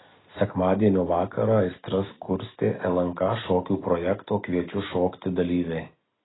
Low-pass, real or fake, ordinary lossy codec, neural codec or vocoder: 7.2 kHz; real; AAC, 16 kbps; none